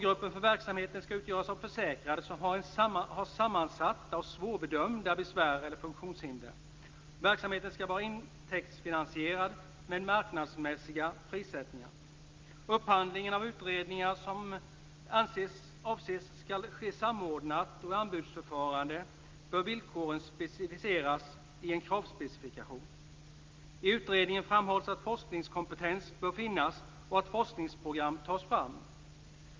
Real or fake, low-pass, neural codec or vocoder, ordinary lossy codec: real; 7.2 kHz; none; Opus, 24 kbps